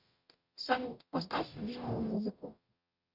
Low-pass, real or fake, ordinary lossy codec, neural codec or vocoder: 5.4 kHz; fake; Opus, 64 kbps; codec, 44.1 kHz, 0.9 kbps, DAC